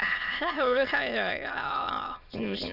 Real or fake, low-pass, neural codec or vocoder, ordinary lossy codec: fake; 5.4 kHz; autoencoder, 22.05 kHz, a latent of 192 numbers a frame, VITS, trained on many speakers; none